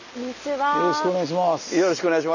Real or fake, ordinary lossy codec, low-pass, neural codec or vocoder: real; none; 7.2 kHz; none